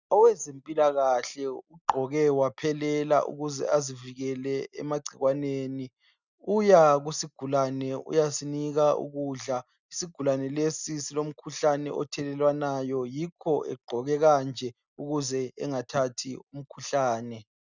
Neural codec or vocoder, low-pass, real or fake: none; 7.2 kHz; real